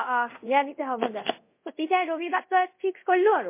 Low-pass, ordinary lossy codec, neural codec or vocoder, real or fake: 3.6 kHz; MP3, 24 kbps; codec, 24 kHz, 0.5 kbps, DualCodec; fake